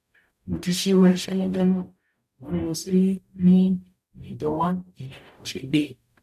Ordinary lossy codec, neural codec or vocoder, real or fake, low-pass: none; codec, 44.1 kHz, 0.9 kbps, DAC; fake; 14.4 kHz